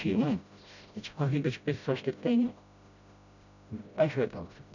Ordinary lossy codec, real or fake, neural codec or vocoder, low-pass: none; fake; codec, 16 kHz, 0.5 kbps, FreqCodec, smaller model; 7.2 kHz